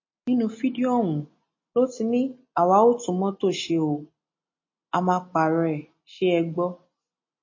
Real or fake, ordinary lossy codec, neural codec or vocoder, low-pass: real; MP3, 32 kbps; none; 7.2 kHz